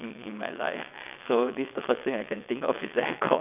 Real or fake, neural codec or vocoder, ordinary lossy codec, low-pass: fake; vocoder, 22.05 kHz, 80 mel bands, WaveNeXt; none; 3.6 kHz